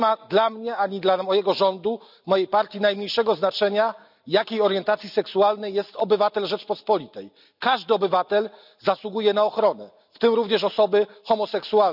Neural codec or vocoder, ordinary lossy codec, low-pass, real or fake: none; none; 5.4 kHz; real